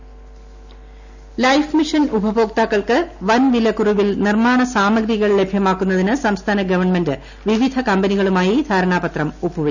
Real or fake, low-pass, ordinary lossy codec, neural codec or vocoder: real; 7.2 kHz; none; none